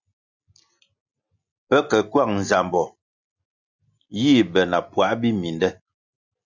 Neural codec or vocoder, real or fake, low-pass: none; real; 7.2 kHz